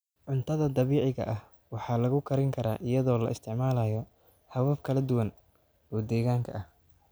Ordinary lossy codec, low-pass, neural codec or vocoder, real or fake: none; none; none; real